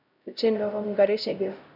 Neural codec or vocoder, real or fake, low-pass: codec, 16 kHz, 0.5 kbps, X-Codec, HuBERT features, trained on LibriSpeech; fake; 5.4 kHz